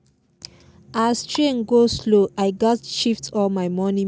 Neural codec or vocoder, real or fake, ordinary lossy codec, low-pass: none; real; none; none